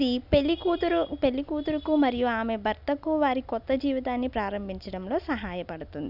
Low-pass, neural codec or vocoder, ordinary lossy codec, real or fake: 5.4 kHz; none; none; real